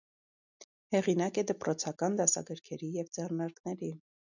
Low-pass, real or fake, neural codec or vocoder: 7.2 kHz; real; none